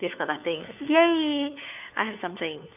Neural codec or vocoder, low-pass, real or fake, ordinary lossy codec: codec, 16 kHz, 4 kbps, FunCodec, trained on LibriTTS, 50 frames a second; 3.6 kHz; fake; none